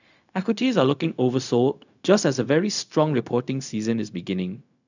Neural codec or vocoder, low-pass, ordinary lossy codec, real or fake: codec, 16 kHz, 0.4 kbps, LongCat-Audio-Codec; 7.2 kHz; none; fake